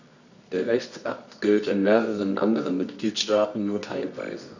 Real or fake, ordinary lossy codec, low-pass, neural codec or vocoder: fake; none; 7.2 kHz; codec, 24 kHz, 0.9 kbps, WavTokenizer, medium music audio release